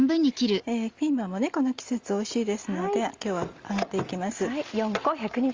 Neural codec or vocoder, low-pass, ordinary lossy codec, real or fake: none; 7.2 kHz; Opus, 32 kbps; real